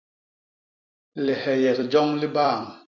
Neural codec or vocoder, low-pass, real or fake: vocoder, 44.1 kHz, 128 mel bands every 256 samples, BigVGAN v2; 7.2 kHz; fake